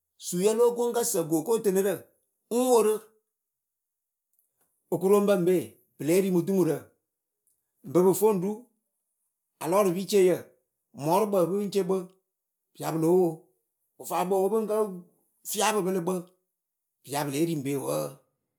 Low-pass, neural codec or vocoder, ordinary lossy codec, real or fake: none; none; none; real